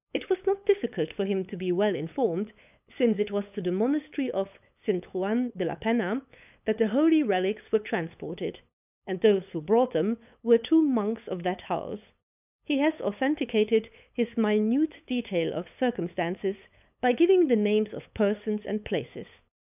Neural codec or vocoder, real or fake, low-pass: codec, 16 kHz, 8 kbps, FunCodec, trained on LibriTTS, 25 frames a second; fake; 3.6 kHz